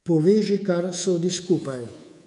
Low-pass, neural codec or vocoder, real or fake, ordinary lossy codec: 10.8 kHz; codec, 24 kHz, 3.1 kbps, DualCodec; fake; none